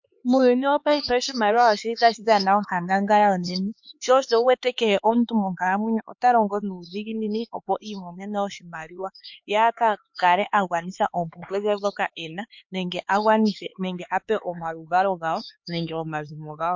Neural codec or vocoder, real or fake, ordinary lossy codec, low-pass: codec, 16 kHz, 4 kbps, X-Codec, HuBERT features, trained on LibriSpeech; fake; MP3, 48 kbps; 7.2 kHz